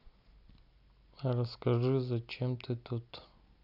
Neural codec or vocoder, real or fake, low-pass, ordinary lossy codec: none; real; 5.4 kHz; none